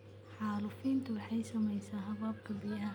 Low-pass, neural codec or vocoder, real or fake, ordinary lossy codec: none; none; real; none